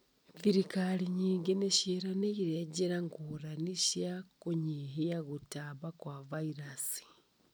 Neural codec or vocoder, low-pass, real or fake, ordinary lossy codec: none; none; real; none